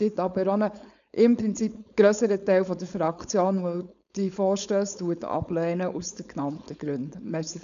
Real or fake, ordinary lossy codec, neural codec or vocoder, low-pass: fake; none; codec, 16 kHz, 4.8 kbps, FACodec; 7.2 kHz